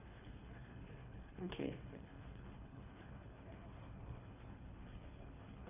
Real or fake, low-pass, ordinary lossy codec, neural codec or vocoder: fake; 3.6 kHz; none; codec, 24 kHz, 3 kbps, HILCodec